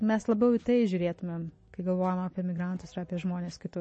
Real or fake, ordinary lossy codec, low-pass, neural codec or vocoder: real; MP3, 32 kbps; 10.8 kHz; none